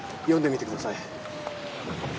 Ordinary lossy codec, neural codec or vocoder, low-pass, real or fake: none; none; none; real